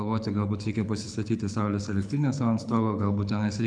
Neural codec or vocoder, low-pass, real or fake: codec, 24 kHz, 6 kbps, HILCodec; 9.9 kHz; fake